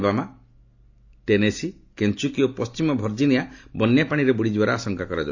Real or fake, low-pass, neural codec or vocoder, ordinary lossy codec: real; 7.2 kHz; none; AAC, 48 kbps